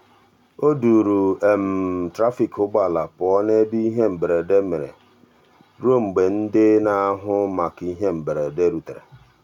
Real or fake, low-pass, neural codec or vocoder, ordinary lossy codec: real; 19.8 kHz; none; none